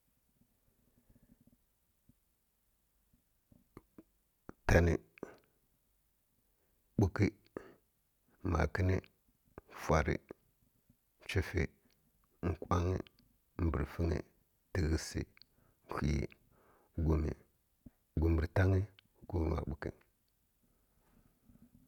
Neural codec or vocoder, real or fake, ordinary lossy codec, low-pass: none; real; none; 19.8 kHz